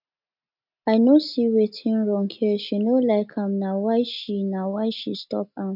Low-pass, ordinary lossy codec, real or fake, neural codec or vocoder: 5.4 kHz; none; real; none